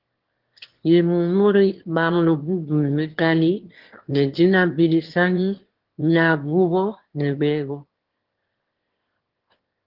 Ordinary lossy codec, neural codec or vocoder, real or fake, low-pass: Opus, 16 kbps; autoencoder, 22.05 kHz, a latent of 192 numbers a frame, VITS, trained on one speaker; fake; 5.4 kHz